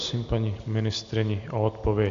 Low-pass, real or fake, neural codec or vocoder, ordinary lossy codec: 7.2 kHz; real; none; AAC, 64 kbps